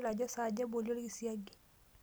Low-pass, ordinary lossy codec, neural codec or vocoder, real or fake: none; none; none; real